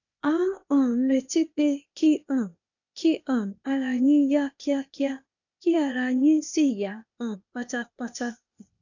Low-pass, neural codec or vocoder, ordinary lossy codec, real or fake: 7.2 kHz; codec, 16 kHz, 0.8 kbps, ZipCodec; none; fake